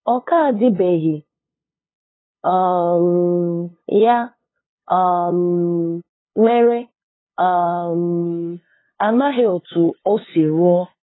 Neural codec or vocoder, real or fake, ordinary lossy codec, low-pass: codec, 16 kHz, 2 kbps, FunCodec, trained on LibriTTS, 25 frames a second; fake; AAC, 16 kbps; 7.2 kHz